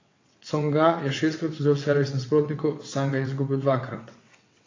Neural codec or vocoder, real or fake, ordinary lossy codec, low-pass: vocoder, 22.05 kHz, 80 mel bands, WaveNeXt; fake; AAC, 32 kbps; 7.2 kHz